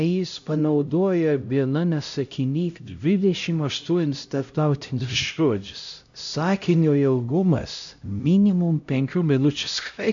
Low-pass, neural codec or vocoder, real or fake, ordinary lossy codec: 7.2 kHz; codec, 16 kHz, 0.5 kbps, X-Codec, HuBERT features, trained on LibriSpeech; fake; AAC, 64 kbps